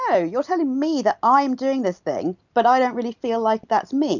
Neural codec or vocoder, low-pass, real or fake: none; 7.2 kHz; real